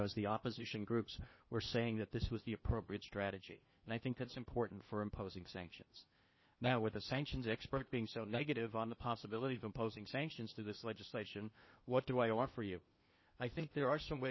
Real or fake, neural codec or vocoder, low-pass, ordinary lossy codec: fake; codec, 16 kHz in and 24 kHz out, 0.8 kbps, FocalCodec, streaming, 65536 codes; 7.2 kHz; MP3, 24 kbps